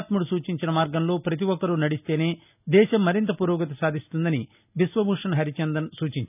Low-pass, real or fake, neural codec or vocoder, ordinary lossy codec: 3.6 kHz; real; none; none